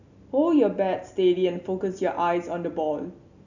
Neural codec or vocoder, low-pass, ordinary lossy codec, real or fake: none; 7.2 kHz; none; real